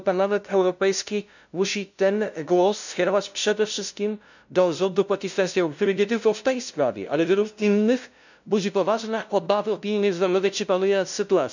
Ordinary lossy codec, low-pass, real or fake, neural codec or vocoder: none; 7.2 kHz; fake; codec, 16 kHz, 0.5 kbps, FunCodec, trained on LibriTTS, 25 frames a second